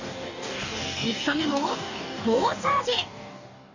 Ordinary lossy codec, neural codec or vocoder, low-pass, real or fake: none; codec, 44.1 kHz, 2.6 kbps, DAC; 7.2 kHz; fake